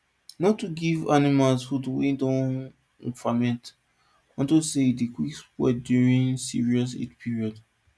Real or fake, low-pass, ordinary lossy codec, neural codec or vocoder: real; none; none; none